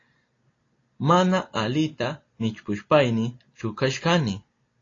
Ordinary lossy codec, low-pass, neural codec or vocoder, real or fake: AAC, 32 kbps; 7.2 kHz; none; real